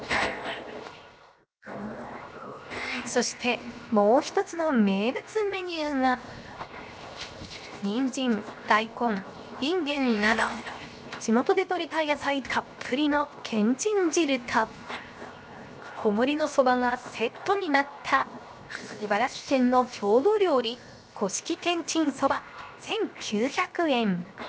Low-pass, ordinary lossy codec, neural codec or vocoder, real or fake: none; none; codec, 16 kHz, 0.7 kbps, FocalCodec; fake